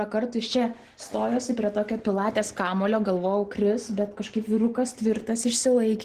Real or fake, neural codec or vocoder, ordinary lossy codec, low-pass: real; none; Opus, 16 kbps; 14.4 kHz